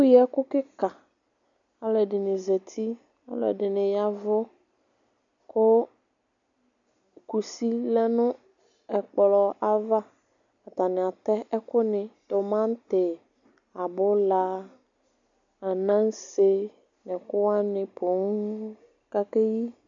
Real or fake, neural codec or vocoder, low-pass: real; none; 7.2 kHz